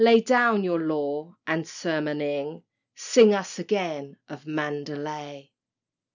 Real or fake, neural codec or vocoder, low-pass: real; none; 7.2 kHz